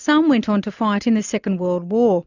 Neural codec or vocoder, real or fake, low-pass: none; real; 7.2 kHz